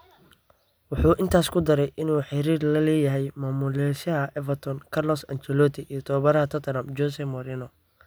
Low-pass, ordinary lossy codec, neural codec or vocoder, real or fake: none; none; none; real